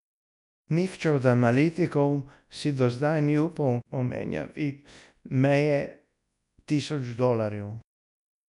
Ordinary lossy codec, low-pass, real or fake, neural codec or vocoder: none; 10.8 kHz; fake; codec, 24 kHz, 0.9 kbps, WavTokenizer, large speech release